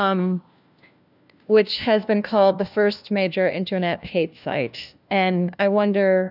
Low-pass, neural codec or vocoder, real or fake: 5.4 kHz; codec, 16 kHz, 1 kbps, FunCodec, trained on LibriTTS, 50 frames a second; fake